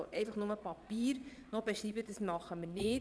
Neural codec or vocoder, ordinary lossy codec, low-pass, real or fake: vocoder, 22.05 kHz, 80 mel bands, Vocos; none; none; fake